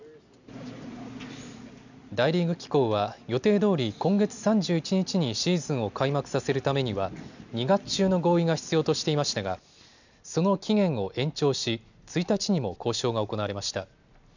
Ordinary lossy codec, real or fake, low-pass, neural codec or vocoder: none; real; 7.2 kHz; none